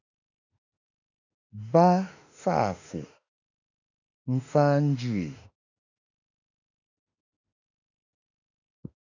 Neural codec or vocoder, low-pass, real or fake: autoencoder, 48 kHz, 32 numbers a frame, DAC-VAE, trained on Japanese speech; 7.2 kHz; fake